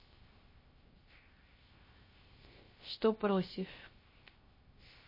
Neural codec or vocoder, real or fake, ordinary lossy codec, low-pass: codec, 16 kHz, 0.3 kbps, FocalCodec; fake; MP3, 24 kbps; 5.4 kHz